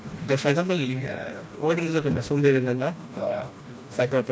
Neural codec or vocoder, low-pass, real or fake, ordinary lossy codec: codec, 16 kHz, 1 kbps, FreqCodec, smaller model; none; fake; none